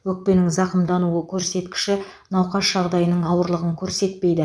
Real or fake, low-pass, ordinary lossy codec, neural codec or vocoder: fake; none; none; vocoder, 22.05 kHz, 80 mel bands, Vocos